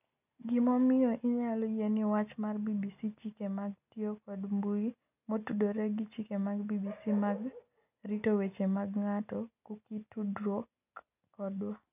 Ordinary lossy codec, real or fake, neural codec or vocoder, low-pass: none; real; none; 3.6 kHz